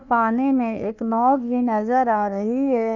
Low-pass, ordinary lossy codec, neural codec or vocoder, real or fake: 7.2 kHz; none; codec, 16 kHz, 1 kbps, FunCodec, trained on Chinese and English, 50 frames a second; fake